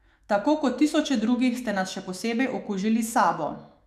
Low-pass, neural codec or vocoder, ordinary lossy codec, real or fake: 14.4 kHz; autoencoder, 48 kHz, 128 numbers a frame, DAC-VAE, trained on Japanese speech; none; fake